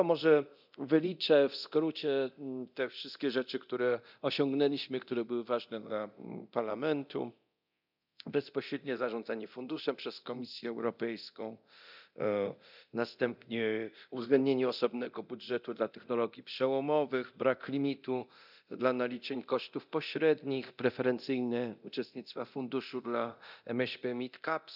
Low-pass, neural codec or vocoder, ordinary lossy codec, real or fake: 5.4 kHz; codec, 24 kHz, 0.9 kbps, DualCodec; none; fake